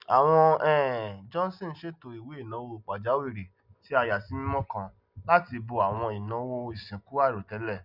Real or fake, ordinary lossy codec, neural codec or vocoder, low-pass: real; none; none; 5.4 kHz